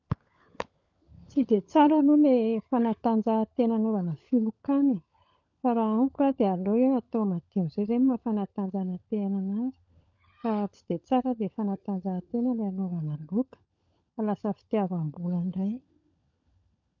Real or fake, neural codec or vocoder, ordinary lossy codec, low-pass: fake; codec, 16 kHz, 16 kbps, FunCodec, trained on LibriTTS, 50 frames a second; none; 7.2 kHz